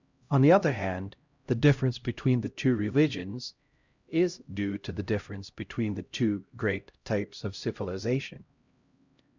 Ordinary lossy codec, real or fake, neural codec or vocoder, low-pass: Opus, 64 kbps; fake; codec, 16 kHz, 0.5 kbps, X-Codec, HuBERT features, trained on LibriSpeech; 7.2 kHz